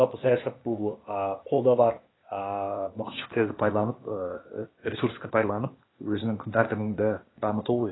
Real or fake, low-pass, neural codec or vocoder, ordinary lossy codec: fake; 7.2 kHz; codec, 16 kHz, 0.8 kbps, ZipCodec; AAC, 16 kbps